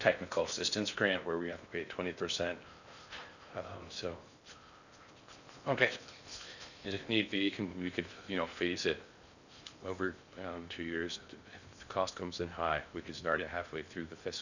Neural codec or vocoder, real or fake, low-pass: codec, 16 kHz in and 24 kHz out, 0.6 kbps, FocalCodec, streaming, 4096 codes; fake; 7.2 kHz